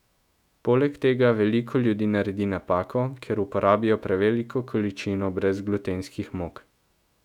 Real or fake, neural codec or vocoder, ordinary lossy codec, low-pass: fake; autoencoder, 48 kHz, 128 numbers a frame, DAC-VAE, trained on Japanese speech; none; 19.8 kHz